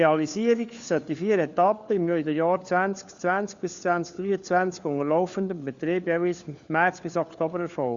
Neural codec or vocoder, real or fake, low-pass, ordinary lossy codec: codec, 16 kHz, 4 kbps, FunCodec, trained on LibriTTS, 50 frames a second; fake; 7.2 kHz; Opus, 64 kbps